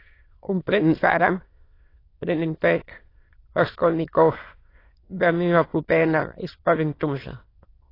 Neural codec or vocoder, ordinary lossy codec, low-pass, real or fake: autoencoder, 22.05 kHz, a latent of 192 numbers a frame, VITS, trained on many speakers; AAC, 24 kbps; 5.4 kHz; fake